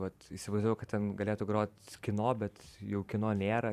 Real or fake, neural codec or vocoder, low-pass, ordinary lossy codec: fake; vocoder, 44.1 kHz, 128 mel bands every 512 samples, BigVGAN v2; 14.4 kHz; Opus, 64 kbps